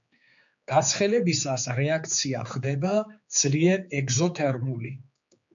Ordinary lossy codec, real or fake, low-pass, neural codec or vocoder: MP3, 64 kbps; fake; 7.2 kHz; codec, 16 kHz, 4 kbps, X-Codec, HuBERT features, trained on general audio